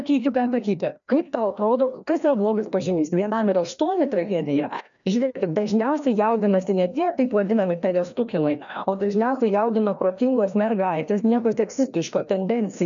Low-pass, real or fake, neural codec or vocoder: 7.2 kHz; fake; codec, 16 kHz, 1 kbps, FreqCodec, larger model